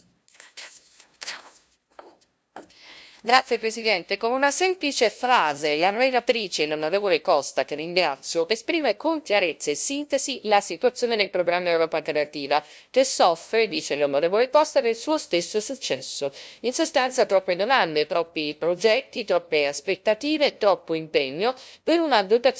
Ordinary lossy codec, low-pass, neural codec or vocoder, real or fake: none; none; codec, 16 kHz, 0.5 kbps, FunCodec, trained on LibriTTS, 25 frames a second; fake